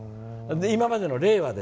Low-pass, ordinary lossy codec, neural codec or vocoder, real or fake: none; none; none; real